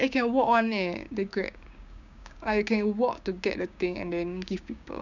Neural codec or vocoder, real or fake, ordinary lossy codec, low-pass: codec, 16 kHz, 6 kbps, DAC; fake; none; 7.2 kHz